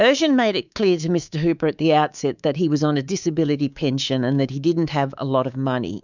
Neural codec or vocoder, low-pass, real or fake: codec, 24 kHz, 3.1 kbps, DualCodec; 7.2 kHz; fake